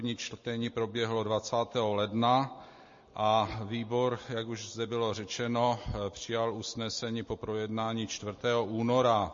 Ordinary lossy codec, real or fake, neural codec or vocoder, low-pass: MP3, 32 kbps; real; none; 7.2 kHz